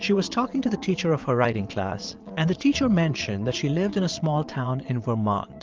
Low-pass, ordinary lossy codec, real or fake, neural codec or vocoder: 7.2 kHz; Opus, 24 kbps; fake; vocoder, 22.05 kHz, 80 mel bands, Vocos